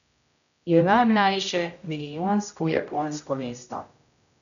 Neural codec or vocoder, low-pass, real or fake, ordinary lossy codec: codec, 16 kHz, 0.5 kbps, X-Codec, HuBERT features, trained on general audio; 7.2 kHz; fake; none